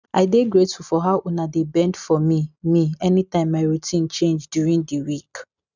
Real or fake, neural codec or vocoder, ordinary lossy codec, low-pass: real; none; none; 7.2 kHz